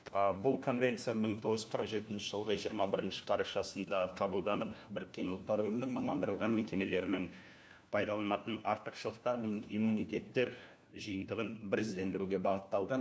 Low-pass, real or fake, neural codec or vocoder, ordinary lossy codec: none; fake; codec, 16 kHz, 1 kbps, FunCodec, trained on LibriTTS, 50 frames a second; none